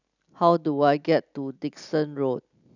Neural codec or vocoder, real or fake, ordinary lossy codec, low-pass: none; real; none; 7.2 kHz